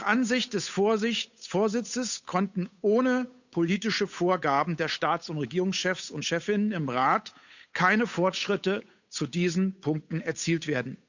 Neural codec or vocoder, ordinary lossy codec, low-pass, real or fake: codec, 16 kHz, 8 kbps, FunCodec, trained on Chinese and English, 25 frames a second; none; 7.2 kHz; fake